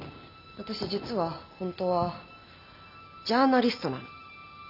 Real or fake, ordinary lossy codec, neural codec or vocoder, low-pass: real; none; none; 5.4 kHz